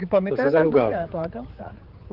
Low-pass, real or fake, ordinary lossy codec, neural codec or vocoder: 5.4 kHz; fake; Opus, 16 kbps; codec, 16 kHz, 4 kbps, X-Codec, HuBERT features, trained on general audio